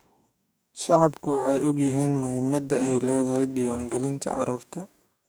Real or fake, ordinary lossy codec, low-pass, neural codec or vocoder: fake; none; none; codec, 44.1 kHz, 2.6 kbps, DAC